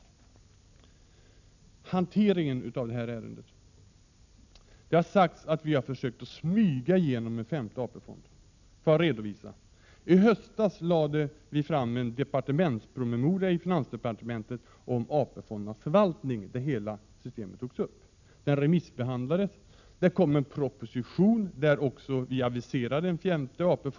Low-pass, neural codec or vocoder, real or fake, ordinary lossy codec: 7.2 kHz; none; real; none